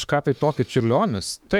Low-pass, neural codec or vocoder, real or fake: 19.8 kHz; autoencoder, 48 kHz, 32 numbers a frame, DAC-VAE, trained on Japanese speech; fake